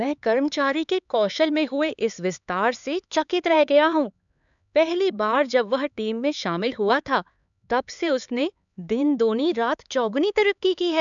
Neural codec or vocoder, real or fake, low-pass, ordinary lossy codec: codec, 16 kHz, 4 kbps, X-Codec, HuBERT features, trained on LibriSpeech; fake; 7.2 kHz; none